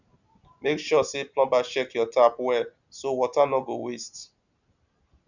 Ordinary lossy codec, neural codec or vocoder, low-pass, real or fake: none; none; 7.2 kHz; real